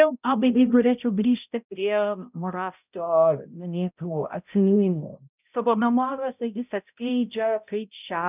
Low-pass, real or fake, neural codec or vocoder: 3.6 kHz; fake; codec, 16 kHz, 0.5 kbps, X-Codec, HuBERT features, trained on balanced general audio